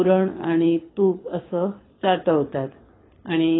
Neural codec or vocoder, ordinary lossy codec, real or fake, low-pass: codec, 24 kHz, 6 kbps, HILCodec; AAC, 16 kbps; fake; 7.2 kHz